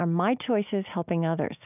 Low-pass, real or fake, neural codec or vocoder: 3.6 kHz; real; none